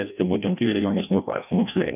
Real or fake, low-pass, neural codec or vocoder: fake; 3.6 kHz; codec, 16 kHz, 1 kbps, FreqCodec, larger model